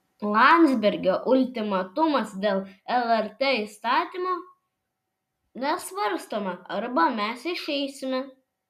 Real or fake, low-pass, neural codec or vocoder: real; 14.4 kHz; none